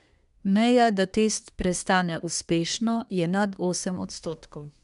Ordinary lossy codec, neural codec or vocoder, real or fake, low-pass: none; codec, 24 kHz, 1 kbps, SNAC; fake; 10.8 kHz